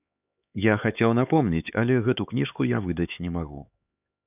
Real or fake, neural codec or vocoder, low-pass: fake; codec, 16 kHz, 4 kbps, X-Codec, HuBERT features, trained on LibriSpeech; 3.6 kHz